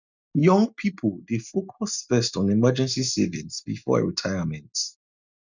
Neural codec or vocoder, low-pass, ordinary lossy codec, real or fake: none; 7.2 kHz; none; real